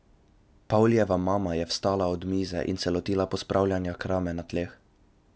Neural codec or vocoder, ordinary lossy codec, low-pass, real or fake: none; none; none; real